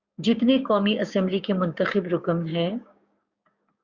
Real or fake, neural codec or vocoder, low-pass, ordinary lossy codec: fake; codec, 16 kHz, 6 kbps, DAC; 7.2 kHz; Opus, 64 kbps